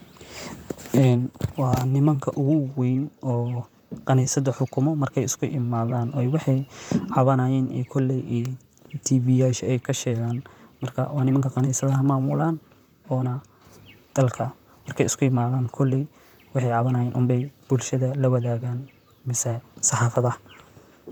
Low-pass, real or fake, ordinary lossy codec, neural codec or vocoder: 19.8 kHz; fake; none; vocoder, 44.1 kHz, 128 mel bands, Pupu-Vocoder